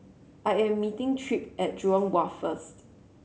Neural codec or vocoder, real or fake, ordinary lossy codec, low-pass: none; real; none; none